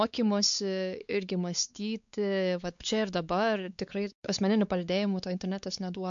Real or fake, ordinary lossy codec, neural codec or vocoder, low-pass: fake; MP3, 64 kbps; codec, 16 kHz, 4 kbps, X-Codec, WavLM features, trained on Multilingual LibriSpeech; 7.2 kHz